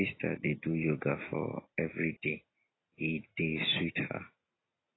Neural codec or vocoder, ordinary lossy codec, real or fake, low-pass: none; AAC, 16 kbps; real; 7.2 kHz